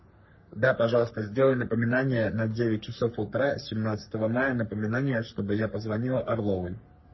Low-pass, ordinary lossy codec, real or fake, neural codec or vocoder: 7.2 kHz; MP3, 24 kbps; fake; codec, 44.1 kHz, 3.4 kbps, Pupu-Codec